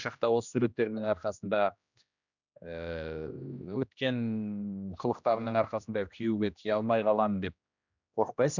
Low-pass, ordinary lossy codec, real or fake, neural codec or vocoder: 7.2 kHz; none; fake; codec, 16 kHz, 1 kbps, X-Codec, HuBERT features, trained on general audio